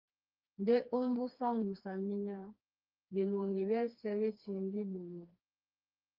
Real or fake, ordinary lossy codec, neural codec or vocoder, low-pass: fake; Opus, 32 kbps; codec, 16 kHz, 2 kbps, FreqCodec, smaller model; 5.4 kHz